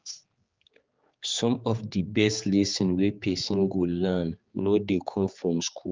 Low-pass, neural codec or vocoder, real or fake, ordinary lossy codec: 7.2 kHz; codec, 16 kHz, 4 kbps, X-Codec, HuBERT features, trained on general audio; fake; Opus, 32 kbps